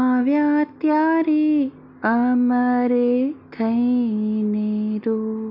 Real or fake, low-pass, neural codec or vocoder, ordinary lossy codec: real; 5.4 kHz; none; MP3, 48 kbps